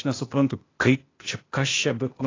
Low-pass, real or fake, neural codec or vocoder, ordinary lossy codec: 7.2 kHz; fake; codec, 16 kHz, 0.8 kbps, ZipCodec; AAC, 32 kbps